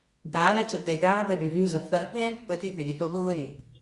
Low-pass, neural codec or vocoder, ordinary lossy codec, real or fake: 10.8 kHz; codec, 24 kHz, 0.9 kbps, WavTokenizer, medium music audio release; Opus, 64 kbps; fake